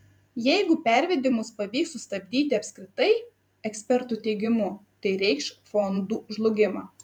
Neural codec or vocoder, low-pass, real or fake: vocoder, 44.1 kHz, 128 mel bands every 512 samples, BigVGAN v2; 19.8 kHz; fake